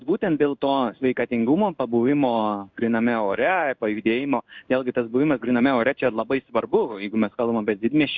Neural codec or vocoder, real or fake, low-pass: codec, 16 kHz in and 24 kHz out, 1 kbps, XY-Tokenizer; fake; 7.2 kHz